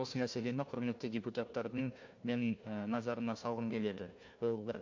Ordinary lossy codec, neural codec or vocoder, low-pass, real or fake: MP3, 48 kbps; codec, 16 kHz, 1 kbps, FunCodec, trained on Chinese and English, 50 frames a second; 7.2 kHz; fake